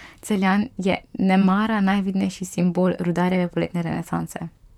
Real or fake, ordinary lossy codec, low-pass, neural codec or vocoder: fake; none; 19.8 kHz; vocoder, 44.1 kHz, 128 mel bands, Pupu-Vocoder